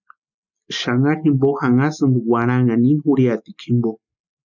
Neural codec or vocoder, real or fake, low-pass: none; real; 7.2 kHz